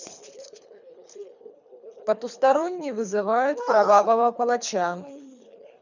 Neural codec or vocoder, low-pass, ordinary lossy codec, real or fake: codec, 24 kHz, 3 kbps, HILCodec; 7.2 kHz; none; fake